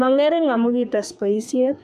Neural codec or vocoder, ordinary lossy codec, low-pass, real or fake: codec, 32 kHz, 1.9 kbps, SNAC; none; 14.4 kHz; fake